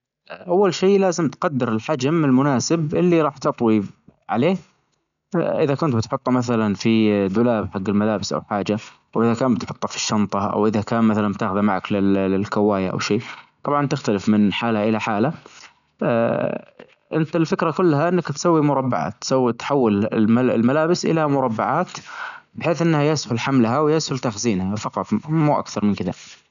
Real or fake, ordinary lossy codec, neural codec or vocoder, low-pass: real; none; none; 7.2 kHz